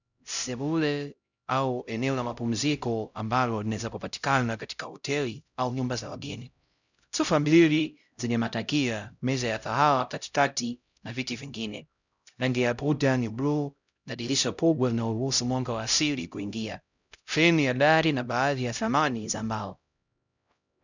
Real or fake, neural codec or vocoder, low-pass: fake; codec, 16 kHz, 0.5 kbps, X-Codec, HuBERT features, trained on LibriSpeech; 7.2 kHz